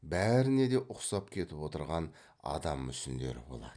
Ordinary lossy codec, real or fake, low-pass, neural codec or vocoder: none; real; 9.9 kHz; none